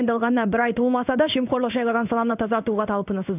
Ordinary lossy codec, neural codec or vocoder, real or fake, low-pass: none; codec, 16 kHz in and 24 kHz out, 1 kbps, XY-Tokenizer; fake; 3.6 kHz